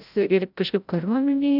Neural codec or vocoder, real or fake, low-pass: codec, 16 kHz, 0.5 kbps, FreqCodec, larger model; fake; 5.4 kHz